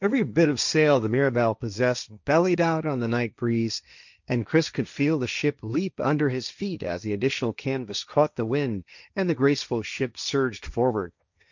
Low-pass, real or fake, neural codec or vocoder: 7.2 kHz; fake; codec, 16 kHz, 1.1 kbps, Voila-Tokenizer